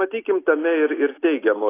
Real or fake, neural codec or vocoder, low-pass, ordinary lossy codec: real; none; 3.6 kHz; AAC, 16 kbps